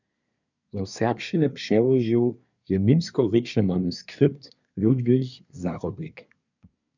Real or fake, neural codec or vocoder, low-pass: fake; codec, 24 kHz, 1 kbps, SNAC; 7.2 kHz